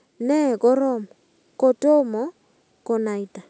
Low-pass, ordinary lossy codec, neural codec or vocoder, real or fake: none; none; none; real